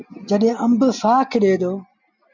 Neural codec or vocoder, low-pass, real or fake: none; 7.2 kHz; real